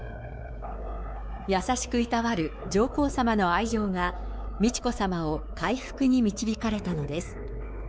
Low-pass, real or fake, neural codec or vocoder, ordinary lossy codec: none; fake; codec, 16 kHz, 4 kbps, X-Codec, WavLM features, trained on Multilingual LibriSpeech; none